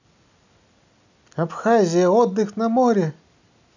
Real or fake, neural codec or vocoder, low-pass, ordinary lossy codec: real; none; 7.2 kHz; none